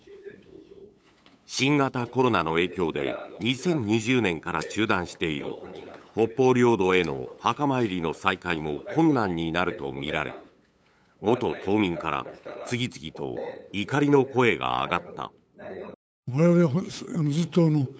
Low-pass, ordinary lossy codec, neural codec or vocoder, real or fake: none; none; codec, 16 kHz, 8 kbps, FunCodec, trained on LibriTTS, 25 frames a second; fake